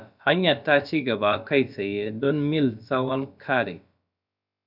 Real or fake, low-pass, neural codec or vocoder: fake; 5.4 kHz; codec, 16 kHz, about 1 kbps, DyCAST, with the encoder's durations